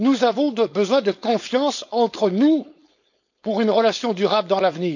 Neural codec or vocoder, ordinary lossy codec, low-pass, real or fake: codec, 16 kHz, 4.8 kbps, FACodec; none; 7.2 kHz; fake